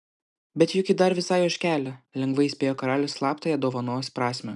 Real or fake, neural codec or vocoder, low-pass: real; none; 10.8 kHz